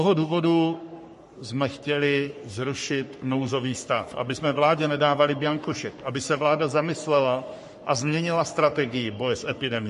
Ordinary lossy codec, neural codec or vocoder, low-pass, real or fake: MP3, 48 kbps; codec, 44.1 kHz, 3.4 kbps, Pupu-Codec; 14.4 kHz; fake